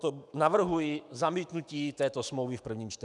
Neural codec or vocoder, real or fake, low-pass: autoencoder, 48 kHz, 128 numbers a frame, DAC-VAE, trained on Japanese speech; fake; 10.8 kHz